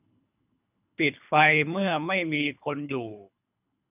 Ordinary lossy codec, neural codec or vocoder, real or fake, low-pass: none; codec, 24 kHz, 3 kbps, HILCodec; fake; 3.6 kHz